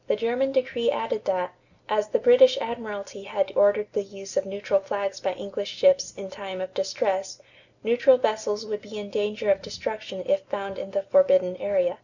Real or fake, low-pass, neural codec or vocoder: real; 7.2 kHz; none